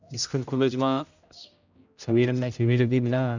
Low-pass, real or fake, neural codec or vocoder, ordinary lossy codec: 7.2 kHz; fake; codec, 16 kHz, 0.5 kbps, X-Codec, HuBERT features, trained on general audio; none